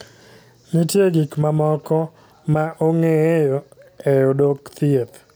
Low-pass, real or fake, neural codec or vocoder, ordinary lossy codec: none; real; none; none